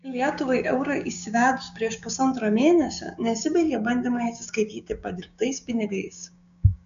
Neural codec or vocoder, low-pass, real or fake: codec, 16 kHz, 6 kbps, DAC; 7.2 kHz; fake